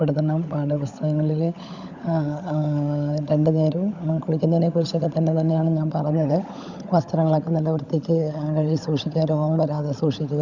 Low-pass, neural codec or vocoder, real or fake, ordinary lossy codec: 7.2 kHz; codec, 16 kHz, 16 kbps, FunCodec, trained on LibriTTS, 50 frames a second; fake; none